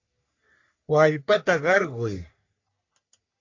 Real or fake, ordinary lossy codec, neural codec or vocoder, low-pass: fake; AAC, 48 kbps; codec, 44.1 kHz, 3.4 kbps, Pupu-Codec; 7.2 kHz